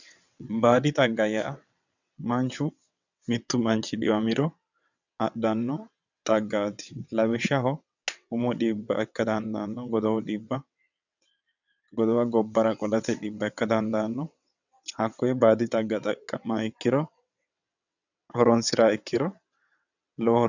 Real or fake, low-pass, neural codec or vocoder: fake; 7.2 kHz; vocoder, 22.05 kHz, 80 mel bands, WaveNeXt